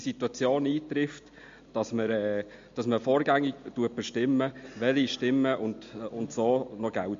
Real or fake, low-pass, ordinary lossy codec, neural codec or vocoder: real; 7.2 kHz; MP3, 48 kbps; none